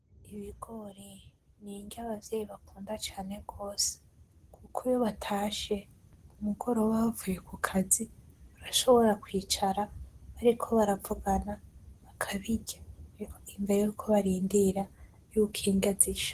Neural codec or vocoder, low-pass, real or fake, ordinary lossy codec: none; 14.4 kHz; real; Opus, 16 kbps